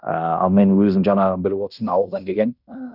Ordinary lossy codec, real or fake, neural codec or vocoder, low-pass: none; fake; codec, 16 kHz in and 24 kHz out, 0.9 kbps, LongCat-Audio-Codec, fine tuned four codebook decoder; 5.4 kHz